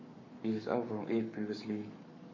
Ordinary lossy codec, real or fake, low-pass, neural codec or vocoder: MP3, 32 kbps; fake; 7.2 kHz; codec, 44.1 kHz, 7.8 kbps, Pupu-Codec